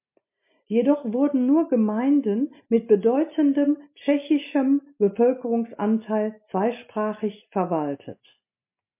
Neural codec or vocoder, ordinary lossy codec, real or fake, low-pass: none; MP3, 24 kbps; real; 3.6 kHz